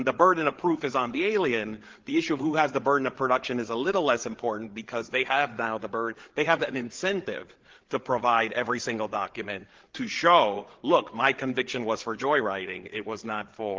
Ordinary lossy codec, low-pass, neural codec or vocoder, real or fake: Opus, 16 kbps; 7.2 kHz; codec, 16 kHz, 8 kbps, FreqCodec, larger model; fake